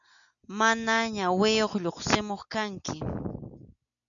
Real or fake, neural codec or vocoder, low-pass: real; none; 7.2 kHz